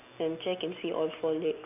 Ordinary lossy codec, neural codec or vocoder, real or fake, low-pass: none; none; real; 3.6 kHz